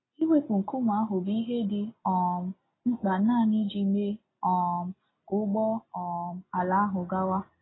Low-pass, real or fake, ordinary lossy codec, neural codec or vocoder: 7.2 kHz; real; AAC, 16 kbps; none